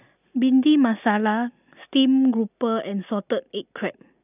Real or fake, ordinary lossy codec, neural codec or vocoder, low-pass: real; none; none; 3.6 kHz